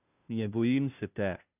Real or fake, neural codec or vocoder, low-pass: fake; codec, 16 kHz, 0.5 kbps, FunCodec, trained on Chinese and English, 25 frames a second; 3.6 kHz